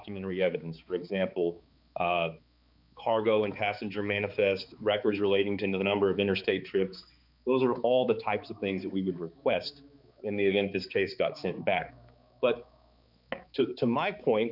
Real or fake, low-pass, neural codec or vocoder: fake; 5.4 kHz; codec, 16 kHz, 4 kbps, X-Codec, HuBERT features, trained on balanced general audio